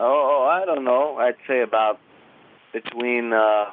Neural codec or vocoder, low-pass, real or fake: none; 5.4 kHz; real